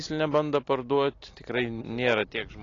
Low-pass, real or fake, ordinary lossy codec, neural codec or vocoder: 7.2 kHz; real; AAC, 32 kbps; none